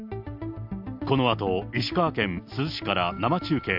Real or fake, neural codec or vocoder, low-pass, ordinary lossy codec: real; none; 5.4 kHz; AAC, 48 kbps